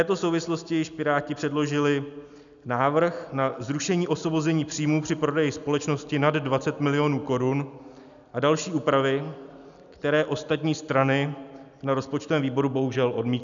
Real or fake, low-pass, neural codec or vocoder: real; 7.2 kHz; none